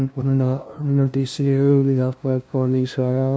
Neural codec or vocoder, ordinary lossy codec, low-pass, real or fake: codec, 16 kHz, 0.5 kbps, FunCodec, trained on LibriTTS, 25 frames a second; none; none; fake